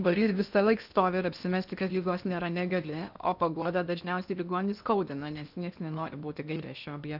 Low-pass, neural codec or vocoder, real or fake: 5.4 kHz; codec, 16 kHz in and 24 kHz out, 0.8 kbps, FocalCodec, streaming, 65536 codes; fake